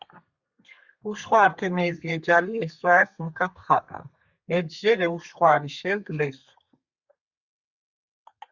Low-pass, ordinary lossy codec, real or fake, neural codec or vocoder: 7.2 kHz; Opus, 64 kbps; fake; codec, 32 kHz, 1.9 kbps, SNAC